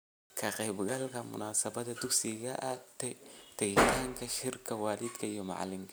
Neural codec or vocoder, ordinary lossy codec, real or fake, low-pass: none; none; real; none